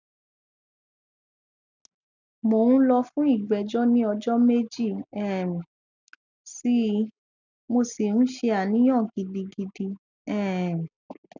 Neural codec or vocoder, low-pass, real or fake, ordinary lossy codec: none; 7.2 kHz; real; none